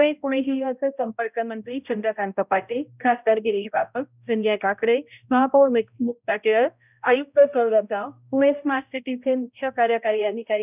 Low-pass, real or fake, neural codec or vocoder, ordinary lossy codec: 3.6 kHz; fake; codec, 16 kHz, 0.5 kbps, X-Codec, HuBERT features, trained on balanced general audio; none